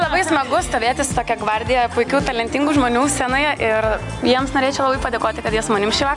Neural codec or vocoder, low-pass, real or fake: none; 10.8 kHz; real